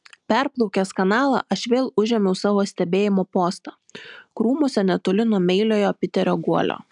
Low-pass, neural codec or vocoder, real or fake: 10.8 kHz; none; real